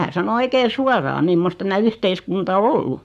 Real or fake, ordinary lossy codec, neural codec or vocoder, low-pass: fake; none; codec, 44.1 kHz, 7.8 kbps, Pupu-Codec; 14.4 kHz